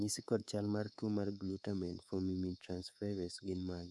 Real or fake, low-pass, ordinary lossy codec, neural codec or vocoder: fake; none; none; codec, 24 kHz, 3.1 kbps, DualCodec